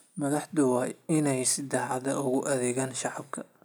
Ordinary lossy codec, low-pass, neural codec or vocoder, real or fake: none; none; none; real